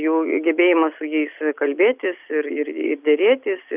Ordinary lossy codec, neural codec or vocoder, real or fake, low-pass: Opus, 64 kbps; none; real; 3.6 kHz